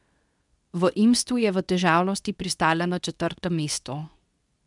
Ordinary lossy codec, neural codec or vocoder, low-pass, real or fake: none; codec, 24 kHz, 0.9 kbps, WavTokenizer, medium speech release version 2; 10.8 kHz; fake